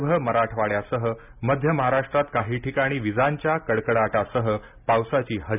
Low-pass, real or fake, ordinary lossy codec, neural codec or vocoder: 3.6 kHz; real; none; none